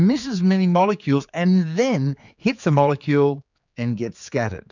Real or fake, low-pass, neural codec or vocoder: fake; 7.2 kHz; codec, 16 kHz, 4 kbps, X-Codec, HuBERT features, trained on general audio